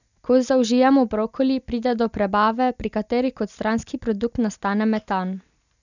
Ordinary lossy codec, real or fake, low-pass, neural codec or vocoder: none; real; 7.2 kHz; none